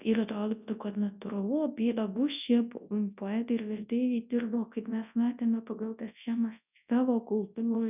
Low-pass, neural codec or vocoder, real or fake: 3.6 kHz; codec, 24 kHz, 0.9 kbps, WavTokenizer, large speech release; fake